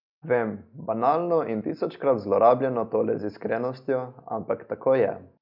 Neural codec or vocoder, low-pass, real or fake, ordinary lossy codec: none; 5.4 kHz; real; none